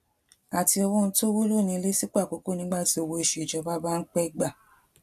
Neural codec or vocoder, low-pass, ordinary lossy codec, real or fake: none; 14.4 kHz; AAC, 96 kbps; real